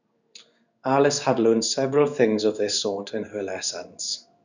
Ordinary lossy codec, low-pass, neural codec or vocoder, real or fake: none; 7.2 kHz; codec, 16 kHz in and 24 kHz out, 1 kbps, XY-Tokenizer; fake